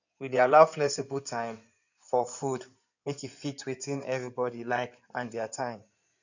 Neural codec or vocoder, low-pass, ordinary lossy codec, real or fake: codec, 16 kHz in and 24 kHz out, 2.2 kbps, FireRedTTS-2 codec; 7.2 kHz; none; fake